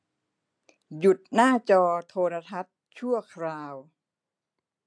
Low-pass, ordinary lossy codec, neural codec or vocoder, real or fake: 9.9 kHz; AAC, 64 kbps; none; real